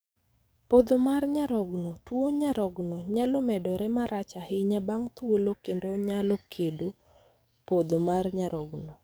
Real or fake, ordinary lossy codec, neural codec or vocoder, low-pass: fake; none; codec, 44.1 kHz, 7.8 kbps, DAC; none